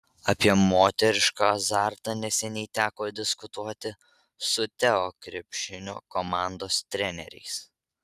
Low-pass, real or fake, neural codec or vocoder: 14.4 kHz; real; none